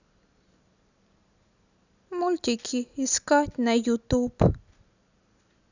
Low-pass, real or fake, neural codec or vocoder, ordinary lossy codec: 7.2 kHz; real; none; none